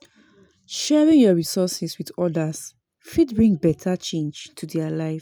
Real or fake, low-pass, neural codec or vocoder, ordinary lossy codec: real; none; none; none